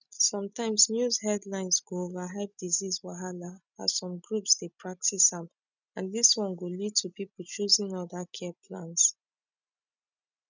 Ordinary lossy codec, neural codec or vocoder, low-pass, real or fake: none; none; 7.2 kHz; real